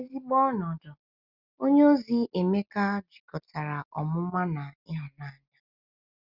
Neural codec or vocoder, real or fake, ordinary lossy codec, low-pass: none; real; Opus, 64 kbps; 5.4 kHz